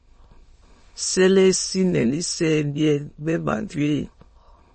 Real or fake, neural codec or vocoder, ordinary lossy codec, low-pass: fake; autoencoder, 22.05 kHz, a latent of 192 numbers a frame, VITS, trained on many speakers; MP3, 32 kbps; 9.9 kHz